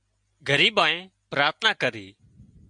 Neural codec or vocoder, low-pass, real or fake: none; 9.9 kHz; real